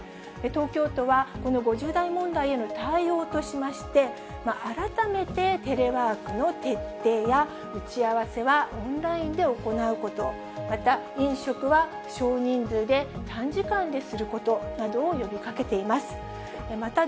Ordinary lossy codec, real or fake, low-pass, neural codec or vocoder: none; real; none; none